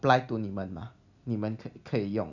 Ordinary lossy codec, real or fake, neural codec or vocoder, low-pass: none; real; none; 7.2 kHz